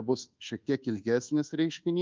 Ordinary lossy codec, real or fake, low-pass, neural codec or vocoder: Opus, 32 kbps; fake; 7.2 kHz; codec, 24 kHz, 1.2 kbps, DualCodec